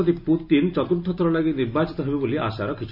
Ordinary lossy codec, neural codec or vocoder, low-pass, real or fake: none; none; 5.4 kHz; real